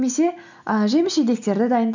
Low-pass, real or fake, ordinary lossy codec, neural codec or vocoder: 7.2 kHz; real; none; none